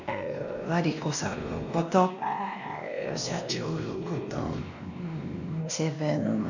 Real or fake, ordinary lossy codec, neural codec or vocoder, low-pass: fake; none; codec, 16 kHz, 1 kbps, X-Codec, WavLM features, trained on Multilingual LibriSpeech; 7.2 kHz